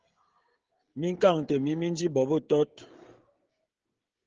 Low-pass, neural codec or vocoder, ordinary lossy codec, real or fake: 7.2 kHz; codec, 16 kHz, 16 kbps, FreqCodec, smaller model; Opus, 16 kbps; fake